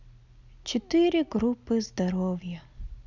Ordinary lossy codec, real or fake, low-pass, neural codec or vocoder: none; real; 7.2 kHz; none